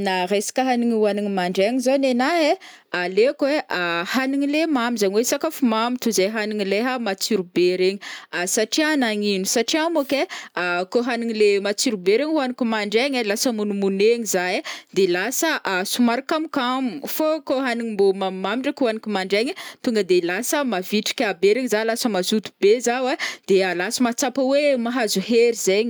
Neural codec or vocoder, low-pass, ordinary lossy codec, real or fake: none; none; none; real